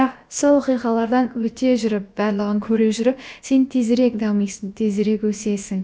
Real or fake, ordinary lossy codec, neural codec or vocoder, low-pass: fake; none; codec, 16 kHz, about 1 kbps, DyCAST, with the encoder's durations; none